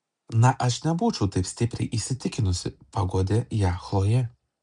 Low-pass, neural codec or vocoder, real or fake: 9.9 kHz; none; real